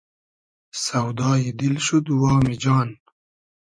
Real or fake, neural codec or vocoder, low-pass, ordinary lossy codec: fake; vocoder, 24 kHz, 100 mel bands, Vocos; 9.9 kHz; MP3, 48 kbps